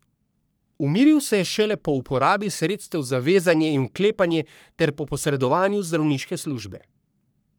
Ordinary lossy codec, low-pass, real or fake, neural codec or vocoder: none; none; fake; codec, 44.1 kHz, 3.4 kbps, Pupu-Codec